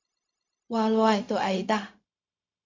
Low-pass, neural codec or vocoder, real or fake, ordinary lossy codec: 7.2 kHz; codec, 16 kHz, 0.4 kbps, LongCat-Audio-Codec; fake; MP3, 64 kbps